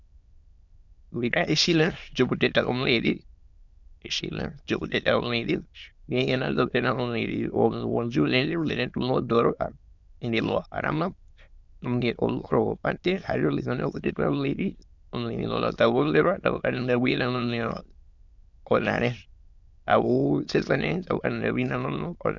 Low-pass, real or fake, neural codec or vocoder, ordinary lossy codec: 7.2 kHz; fake; autoencoder, 22.05 kHz, a latent of 192 numbers a frame, VITS, trained on many speakers; Opus, 64 kbps